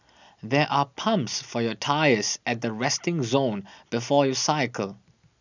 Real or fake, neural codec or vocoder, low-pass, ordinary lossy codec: real; none; 7.2 kHz; none